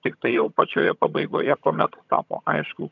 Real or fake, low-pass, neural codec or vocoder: fake; 7.2 kHz; vocoder, 22.05 kHz, 80 mel bands, HiFi-GAN